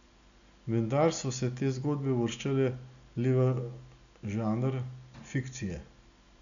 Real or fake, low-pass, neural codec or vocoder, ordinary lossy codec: real; 7.2 kHz; none; none